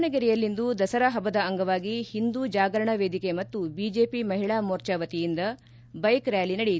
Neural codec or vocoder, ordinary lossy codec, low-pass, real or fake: none; none; none; real